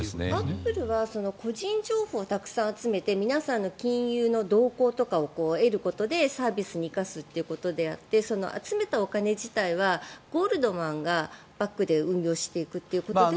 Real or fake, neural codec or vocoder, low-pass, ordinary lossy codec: real; none; none; none